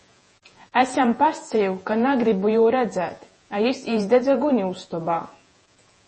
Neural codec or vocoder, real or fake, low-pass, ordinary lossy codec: vocoder, 48 kHz, 128 mel bands, Vocos; fake; 10.8 kHz; MP3, 32 kbps